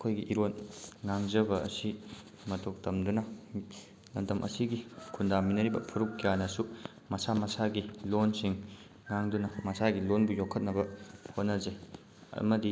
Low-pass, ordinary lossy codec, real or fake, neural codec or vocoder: none; none; real; none